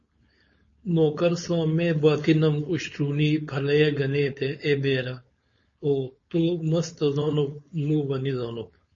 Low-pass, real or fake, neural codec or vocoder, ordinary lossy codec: 7.2 kHz; fake; codec, 16 kHz, 4.8 kbps, FACodec; MP3, 32 kbps